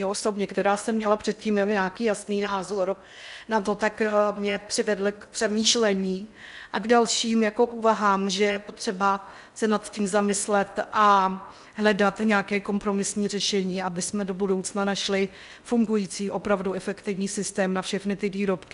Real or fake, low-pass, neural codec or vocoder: fake; 10.8 kHz; codec, 16 kHz in and 24 kHz out, 0.6 kbps, FocalCodec, streaming, 4096 codes